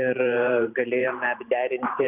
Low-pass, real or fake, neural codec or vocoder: 3.6 kHz; fake; vocoder, 44.1 kHz, 128 mel bands every 512 samples, BigVGAN v2